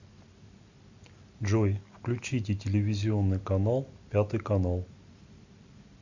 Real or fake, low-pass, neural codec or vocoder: real; 7.2 kHz; none